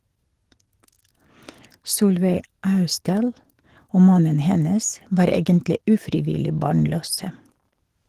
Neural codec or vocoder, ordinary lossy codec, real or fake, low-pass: codec, 44.1 kHz, 7.8 kbps, DAC; Opus, 16 kbps; fake; 14.4 kHz